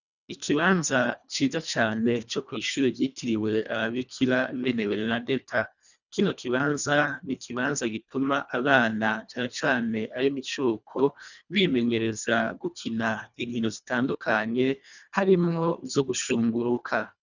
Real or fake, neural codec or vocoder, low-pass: fake; codec, 24 kHz, 1.5 kbps, HILCodec; 7.2 kHz